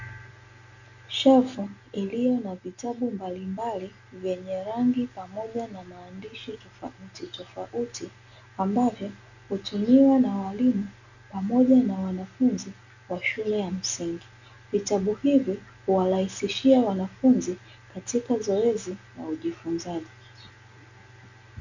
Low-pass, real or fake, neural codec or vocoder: 7.2 kHz; real; none